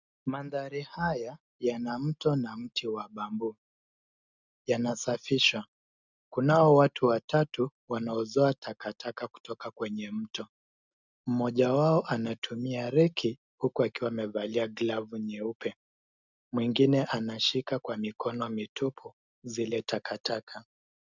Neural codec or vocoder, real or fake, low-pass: none; real; 7.2 kHz